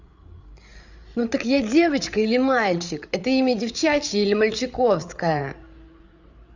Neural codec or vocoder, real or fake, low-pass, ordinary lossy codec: codec, 16 kHz, 8 kbps, FreqCodec, larger model; fake; 7.2 kHz; Opus, 64 kbps